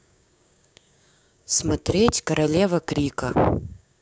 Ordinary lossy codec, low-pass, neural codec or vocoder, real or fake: none; none; codec, 16 kHz, 6 kbps, DAC; fake